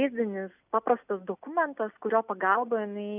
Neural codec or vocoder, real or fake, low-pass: none; real; 3.6 kHz